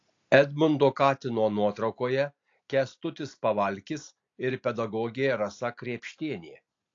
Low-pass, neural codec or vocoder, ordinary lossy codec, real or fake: 7.2 kHz; none; AAC, 48 kbps; real